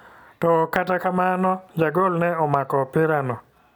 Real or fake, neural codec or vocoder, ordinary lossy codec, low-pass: real; none; none; 19.8 kHz